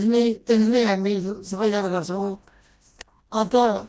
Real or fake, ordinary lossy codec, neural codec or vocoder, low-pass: fake; none; codec, 16 kHz, 1 kbps, FreqCodec, smaller model; none